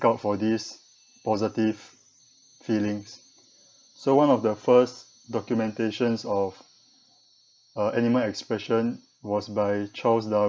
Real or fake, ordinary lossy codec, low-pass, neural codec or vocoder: real; none; none; none